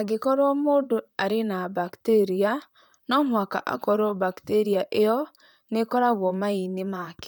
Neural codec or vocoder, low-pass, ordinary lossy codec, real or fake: vocoder, 44.1 kHz, 128 mel bands, Pupu-Vocoder; none; none; fake